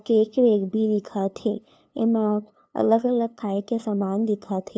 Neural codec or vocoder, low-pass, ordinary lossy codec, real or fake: codec, 16 kHz, 2 kbps, FunCodec, trained on LibriTTS, 25 frames a second; none; none; fake